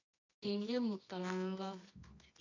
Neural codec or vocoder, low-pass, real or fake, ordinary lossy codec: codec, 24 kHz, 0.9 kbps, WavTokenizer, medium music audio release; 7.2 kHz; fake; AAC, 48 kbps